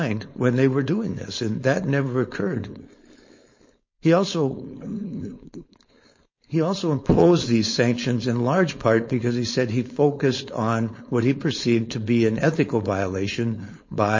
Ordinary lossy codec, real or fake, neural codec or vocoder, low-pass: MP3, 32 kbps; fake; codec, 16 kHz, 4.8 kbps, FACodec; 7.2 kHz